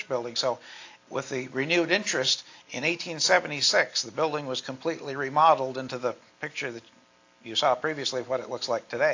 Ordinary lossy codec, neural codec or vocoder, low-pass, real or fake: AAC, 48 kbps; none; 7.2 kHz; real